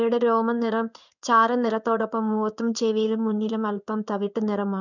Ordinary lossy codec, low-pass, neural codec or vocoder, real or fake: none; 7.2 kHz; codec, 16 kHz in and 24 kHz out, 1 kbps, XY-Tokenizer; fake